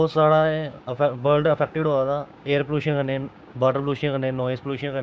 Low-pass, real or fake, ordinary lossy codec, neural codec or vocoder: none; fake; none; codec, 16 kHz, 6 kbps, DAC